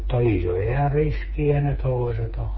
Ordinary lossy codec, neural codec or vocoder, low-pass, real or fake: MP3, 24 kbps; codec, 16 kHz, 4 kbps, FreqCodec, smaller model; 7.2 kHz; fake